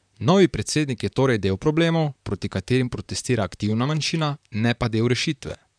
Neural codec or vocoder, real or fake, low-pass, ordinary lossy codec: vocoder, 44.1 kHz, 128 mel bands, Pupu-Vocoder; fake; 9.9 kHz; none